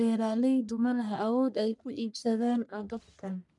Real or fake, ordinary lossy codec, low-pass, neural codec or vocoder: fake; none; 10.8 kHz; codec, 44.1 kHz, 1.7 kbps, Pupu-Codec